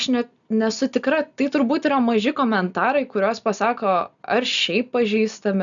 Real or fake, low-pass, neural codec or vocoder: real; 7.2 kHz; none